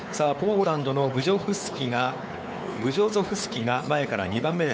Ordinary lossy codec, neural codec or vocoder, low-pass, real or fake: none; codec, 16 kHz, 4 kbps, X-Codec, WavLM features, trained on Multilingual LibriSpeech; none; fake